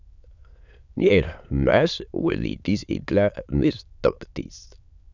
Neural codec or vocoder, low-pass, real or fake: autoencoder, 22.05 kHz, a latent of 192 numbers a frame, VITS, trained on many speakers; 7.2 kHz; fake